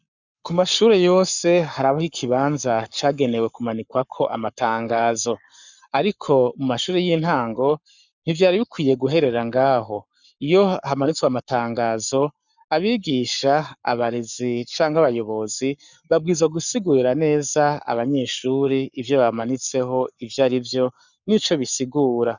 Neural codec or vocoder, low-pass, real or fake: codec, 44.1 kHz, 7.8 kbps, Pupu-Codec; 7.2 kHz; fake